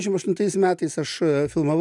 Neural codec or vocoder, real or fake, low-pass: none; real; 10.8 kHz